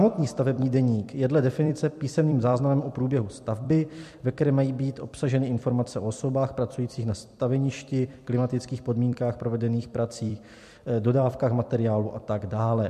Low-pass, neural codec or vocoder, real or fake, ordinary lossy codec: 14.4 kHz; vocoder, 44.1 kHz, 128 mel bands every 256 samples, BigVGAN v2; fake; MP3, 64 kbps